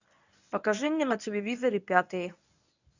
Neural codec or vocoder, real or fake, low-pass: codec, 24 kHz, 0.9 kbps, WavTokenizer, medium speech release version 1; fake; 7.2 kHz